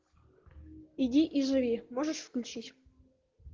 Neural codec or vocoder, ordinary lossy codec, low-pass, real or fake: none; Opus, 16 kbps; 7.2 kHz; real